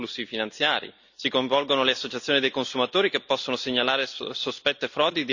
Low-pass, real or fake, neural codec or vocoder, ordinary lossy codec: 7.2 kHz; real; none; none